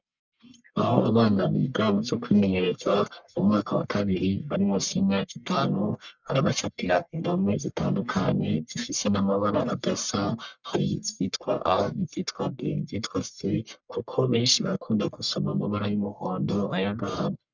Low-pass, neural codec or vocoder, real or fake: 7.2 kHz; codec, 44.1 kHz, 1.7 kbps, Pupu-Codec; fake